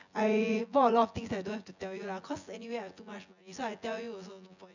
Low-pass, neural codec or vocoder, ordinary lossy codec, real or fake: 7.2 kHz; vocoder, 24 kHz, 100 mel bands, Vocos; none; fake